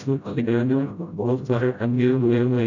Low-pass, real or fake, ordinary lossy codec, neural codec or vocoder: 7.2 kHz; fake; none; codec, 16 kHz, 0.5 kbps, FreqCodec, smaller model